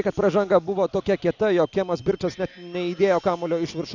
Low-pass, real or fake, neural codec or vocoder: 7.2 kHz; real; none